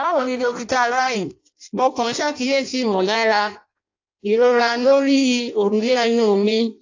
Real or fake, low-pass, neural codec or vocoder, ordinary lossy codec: fake; 7.2 kHz; codec, 16 kHz in and 24 kHz out, 0.6 kbps, FireRedTTS-2 codec; AAC, 48 kbps